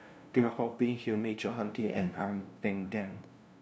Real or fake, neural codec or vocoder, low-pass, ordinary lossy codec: fake; codec, 16 kHz, 0.5 kbps, FunCodec, trained on LibriTTS, 25 frames a second; none; none